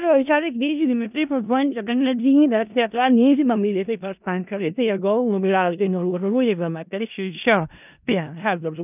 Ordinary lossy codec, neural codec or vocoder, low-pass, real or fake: none; codec, 16 kHz in and 24 kHz out, 0.4 kbps, LongCat-Audio-Codec, four codebook decoder; 3.6 kHz; fake